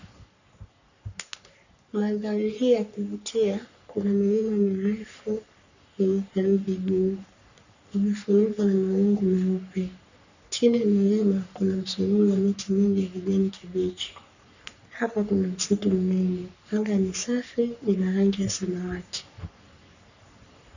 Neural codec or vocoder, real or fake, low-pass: codec, 44.1 kHz, 3.4 kbps, Pupu-Codec; fake; 7.2 kHz